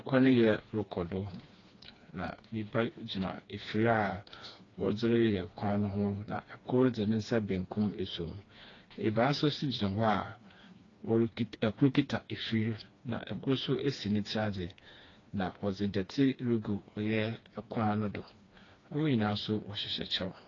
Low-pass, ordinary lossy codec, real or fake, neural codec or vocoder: 7.2 kHz; AAC, 32 kbps; fake; codec, 16 kHz, 2 kbps, FreqCodec, smaller model